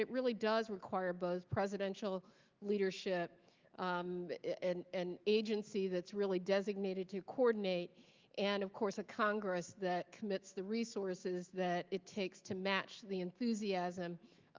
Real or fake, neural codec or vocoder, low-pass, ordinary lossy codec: fake; autoencoder, 48 kHz, 128 numbers a frame, DAC-VAE, trained on Japanese speech; 7.2 kHz; Opus, 24 kbps